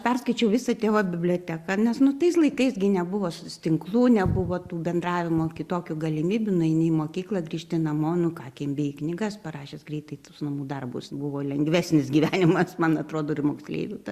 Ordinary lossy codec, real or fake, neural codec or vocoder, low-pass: Opus, 64 kbps; real; none; 14.4 kHz